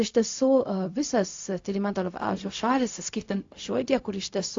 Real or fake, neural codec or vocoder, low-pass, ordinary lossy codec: fake; codec, 16 kHz, 0.4 kbps, LongCat-Audio-Codec; 7.2 kHz; AAC, 48 kbps